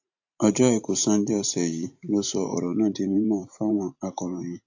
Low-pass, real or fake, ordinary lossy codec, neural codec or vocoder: 7.2 kHz; fake; AAC, 48 kbps; vocoder, 44.1 kHz, 128 mel bands every 512 samples, BigVGAN v2